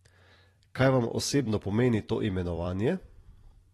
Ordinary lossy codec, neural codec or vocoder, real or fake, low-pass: AAC, 32 kbps; vocoder, 44.1 kHz, 128 mel bands every 256 samples, BigVGAN v2; fake; 19.8 kHz